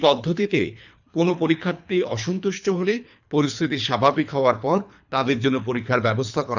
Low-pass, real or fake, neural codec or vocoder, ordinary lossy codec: 7.2 kHz; fake; codec, 24 kHz, 3 kbps, HILCodec; none